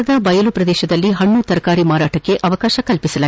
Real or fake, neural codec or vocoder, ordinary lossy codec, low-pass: real; none; none; none